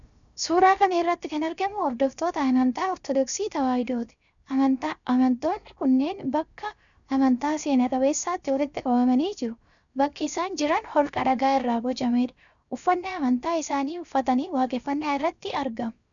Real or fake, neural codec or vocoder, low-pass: fake; codec, 16 kHz, 0.7 kbps, FocalCodec; 7.2 kHz